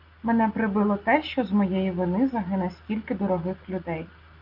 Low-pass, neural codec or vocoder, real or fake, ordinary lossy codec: 5.4 kHz; none; real; Opus, 24 kbps